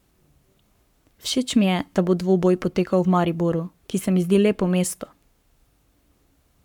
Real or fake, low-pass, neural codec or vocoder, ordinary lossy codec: fake; 19.8 kHz; codec, 44.1 kHz, 7.8 kbps, Pupu-Codec; none